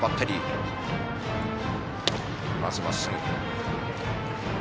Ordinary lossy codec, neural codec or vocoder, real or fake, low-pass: none; none; real; none